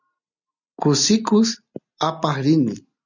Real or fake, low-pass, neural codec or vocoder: real; 7.2 kHz; none